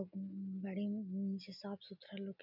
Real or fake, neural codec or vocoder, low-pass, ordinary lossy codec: real; none; 5.4 kHz; none